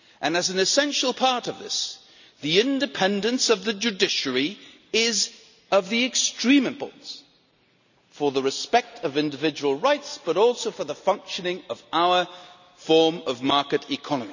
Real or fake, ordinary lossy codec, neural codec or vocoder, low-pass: real; none; none; 7.2 kHz